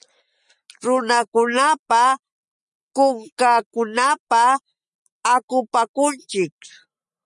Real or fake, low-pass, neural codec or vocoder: real; 10.8 kHz; none